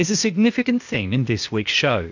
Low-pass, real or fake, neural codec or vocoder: 7.2 kHz; fake; codec, 16 kHz, 0.8 kbps, ZipCodec